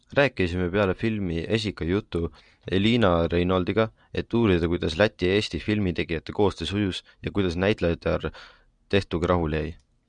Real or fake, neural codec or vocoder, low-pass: real; none; 9.9 kHz